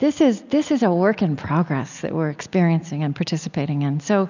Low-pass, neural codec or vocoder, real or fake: 7.2 kHz; none; real